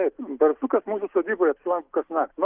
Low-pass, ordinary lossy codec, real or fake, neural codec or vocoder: 3.6 kHz; Opus, 24 kbps; real; none